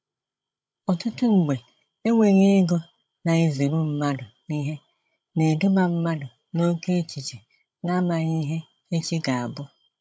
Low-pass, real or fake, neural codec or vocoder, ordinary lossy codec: none; fake; codec, 16 kHz, 16 kbps, FreqCodec, larger model; none